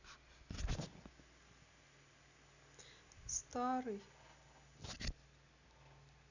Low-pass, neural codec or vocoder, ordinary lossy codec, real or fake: 7.2 kHz; none; none; real